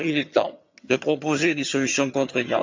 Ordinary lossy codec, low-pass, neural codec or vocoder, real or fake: MP3, 64 kbps; 7.2 kHz; vocoder, 22.05 kHz, 80 mel bands, HiFi-GAN; fake